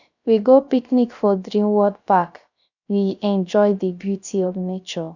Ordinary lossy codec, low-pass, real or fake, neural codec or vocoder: none; 7.2 kHz; fake; codec, 16 kHz, 0.3 kbps, FocalCodec